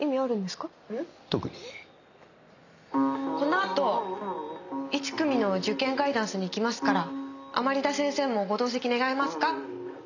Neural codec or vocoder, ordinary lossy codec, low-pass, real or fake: none; none; 7.2 kHz; real